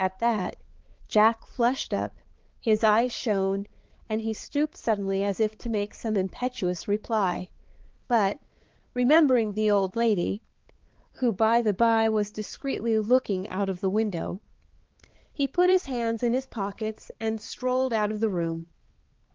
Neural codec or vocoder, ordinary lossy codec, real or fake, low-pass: codec, 16 kHz, 4 kbps, X-Codec, HuBERT features, trained on balanced general audio; Opus, 16 kbps; fake; 7.2 kHz